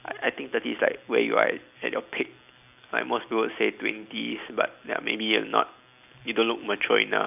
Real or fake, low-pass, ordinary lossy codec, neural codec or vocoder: real; 3.6 kHz; none; none